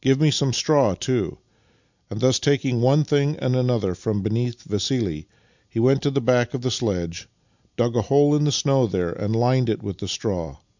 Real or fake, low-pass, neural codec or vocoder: real; 7.2 kHz; none